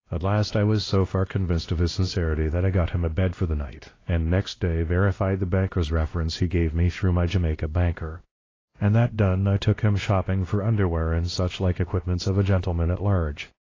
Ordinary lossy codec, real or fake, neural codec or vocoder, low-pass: AAC, 32 kbps; fake; codec, 16 kHz, 1 kbps, X-Codec, WavLM features, trained on Multilingual LibriSpeech; 7.2 kHz